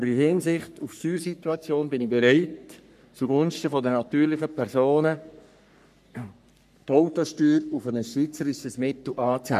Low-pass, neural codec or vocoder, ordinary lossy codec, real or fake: 14.4 kHz; codec, 44.1 kHz, 3.4 kbps, Pupu-Codec; none; fake